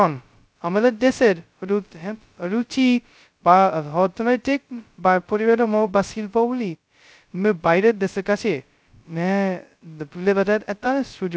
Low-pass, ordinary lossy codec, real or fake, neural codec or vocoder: none; none; fake; codec, 16 kHz, 0.2 kbps, FocalCodec